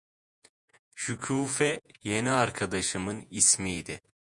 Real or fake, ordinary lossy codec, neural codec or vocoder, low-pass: fake; MP3, 96 kbps; vocoder, 48 kHz, 128 mel bands, Vocos; 10.8 kHz